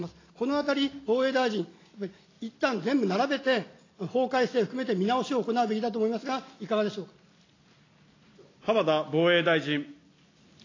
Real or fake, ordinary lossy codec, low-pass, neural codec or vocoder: real; AAC, 32 kbps; 7.2 kHz; none